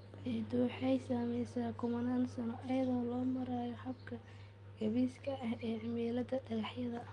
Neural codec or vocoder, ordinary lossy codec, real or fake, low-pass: none; Opus, 32 kbps; real; 10.8 kHz